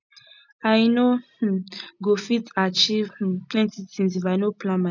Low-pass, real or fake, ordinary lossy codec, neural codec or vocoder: 7.2 kHz; real; none; none